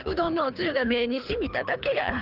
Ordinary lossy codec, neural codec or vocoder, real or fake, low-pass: Opus, 24 kbps; codec, 24 kHz, 3 kbps, HILCodec; fake; 5.4 kHz